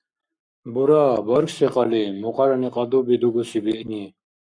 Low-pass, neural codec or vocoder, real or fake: 9.9 kHz; codec, 44.1 kHz, 7.8 kbps, Pupu-Codec; fake